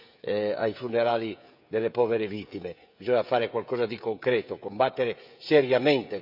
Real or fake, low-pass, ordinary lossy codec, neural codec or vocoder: fake; 5.4 kHz; none; codec, 16 kHz, 16 kbps, FreqCodec, smaller model